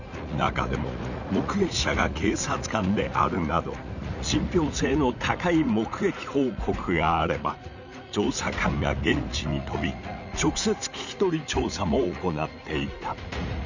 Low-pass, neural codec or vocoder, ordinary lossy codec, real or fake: 7.2 kHz; vocoder, 44.1 kHz, 80 mel bands, Vocos; none; fake